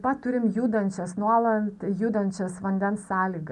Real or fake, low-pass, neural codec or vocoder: real; 10.8 kHz; none